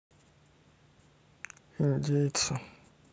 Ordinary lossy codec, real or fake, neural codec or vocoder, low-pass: none; real; none; none